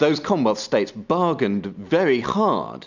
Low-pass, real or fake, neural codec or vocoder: 7.2 kHz; real; none